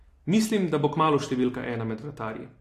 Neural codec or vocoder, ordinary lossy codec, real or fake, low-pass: none; AAC, 48 kbps; real; 14.4 kHz